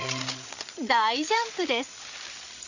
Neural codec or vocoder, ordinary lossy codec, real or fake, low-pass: codec, 16 kHz, 8 kbps, FreqCodec, larger model; none; fake; 7.2 kHz